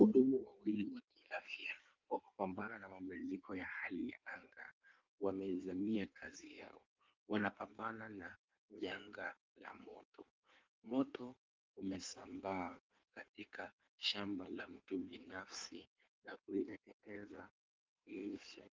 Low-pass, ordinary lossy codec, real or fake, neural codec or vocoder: 7.2 kHz; Opus, 32 kbps; fake; codec, 16 kHz in and 24 kHz out, 1.1 kbps, FireRedTTS-2 codec